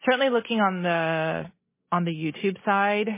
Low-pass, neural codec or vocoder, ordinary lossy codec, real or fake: 3.6 kHz; none; MP3, 16 kbps; real